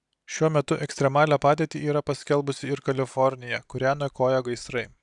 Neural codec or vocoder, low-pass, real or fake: none; 10.8 kHz; real